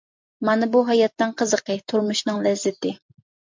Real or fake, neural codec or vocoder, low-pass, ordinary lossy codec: real; none; 7.2 kHz; MP3, 64 kbps